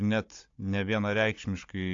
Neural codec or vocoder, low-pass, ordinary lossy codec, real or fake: codec, 16 kHz, 16 kbps, FunCodec, trained on Chinese and English, 50 frames a second; 7.2 kHz; AAC, 48 kbps; fake